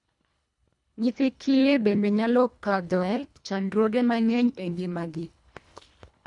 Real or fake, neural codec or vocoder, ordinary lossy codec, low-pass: fake; codec, 24 kHz, 1.5 kbps, HILCodec; none; 10.8 kHz